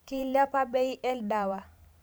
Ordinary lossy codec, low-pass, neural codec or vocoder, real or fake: none; none; vocoder, 44.1 kHz, 128 mel bands every 512 samples, BigVGAN v2; fake